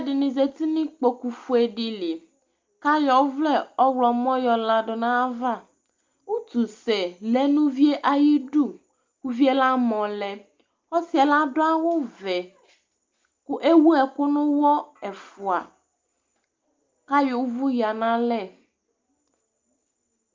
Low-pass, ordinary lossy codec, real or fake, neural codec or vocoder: 7.2 kHz; Opus, 24 kbps; real; none